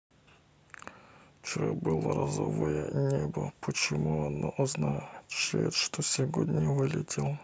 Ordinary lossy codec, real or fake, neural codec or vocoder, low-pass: none; real; none; none